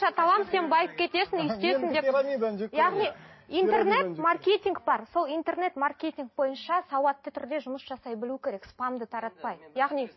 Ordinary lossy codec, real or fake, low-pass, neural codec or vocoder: MP3, 24 kbps; real; 7.2 kHz; none